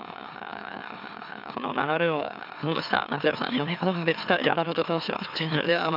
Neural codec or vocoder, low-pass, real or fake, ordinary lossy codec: autoencoder, 44.1 kHz, a latent of 192 numbers a frame, MeloTTS; 5.4 kHz; fake; none